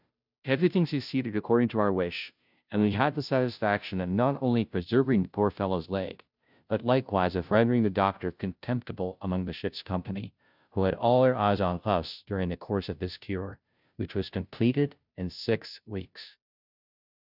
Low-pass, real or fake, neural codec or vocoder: 5.4 kHz; fake; codec, 16 kHz, 0.5 kbps, FunCodec, trained on Chinese and English, 25 frames a second